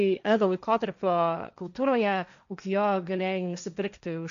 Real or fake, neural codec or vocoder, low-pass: fake; codec, 16 kHz, 1.1 kbps, Voila-Tokenizer; 7.2 kHz